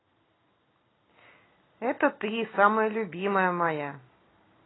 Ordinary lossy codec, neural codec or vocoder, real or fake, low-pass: AAC, 16 kbps; none; real; 7.2 kHz